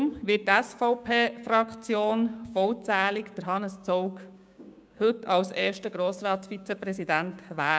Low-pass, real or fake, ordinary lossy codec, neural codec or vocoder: none; fake; none; codec, 16 kHz, 6 kbps, DAC